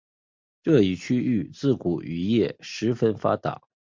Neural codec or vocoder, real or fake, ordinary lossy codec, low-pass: none; real; MP3, 64 kbps; 7.2 kHz